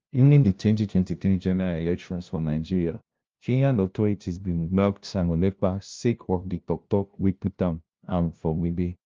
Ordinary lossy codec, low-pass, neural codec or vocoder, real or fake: Opus, 24 kbps; 7.2 kHz; codec, 16 kHz, 0.5 kbps, FunCodec, trained on LibriTTS, 25 frames a second; fake